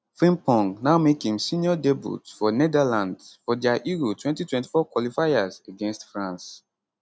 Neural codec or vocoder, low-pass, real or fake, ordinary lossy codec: none; none; real; none